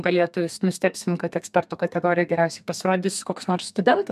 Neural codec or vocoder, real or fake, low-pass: codec, 32 kHz, 1.9 kbps, SNAC; fake; 14.4 kHz